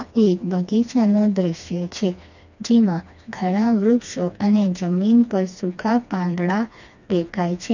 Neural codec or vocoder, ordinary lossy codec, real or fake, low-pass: codec, 16 kHz, 2 kbps, FreqCodec, smaller model; none; fake; 7.2 kHz